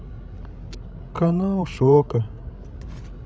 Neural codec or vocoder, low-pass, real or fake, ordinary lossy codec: codec, 16 kHz, 16 kbps, FreqCodec, larger model; none; fake; none